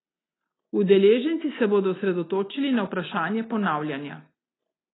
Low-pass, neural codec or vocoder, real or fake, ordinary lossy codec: 7.2 kHz; none; real; AAC, 16 kbps